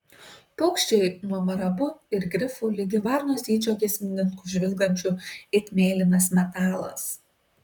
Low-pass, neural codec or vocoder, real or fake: 19.8 kHz; vocoder, 44.1 kHz, 128 mel bands, Pupu-Vocoder; fake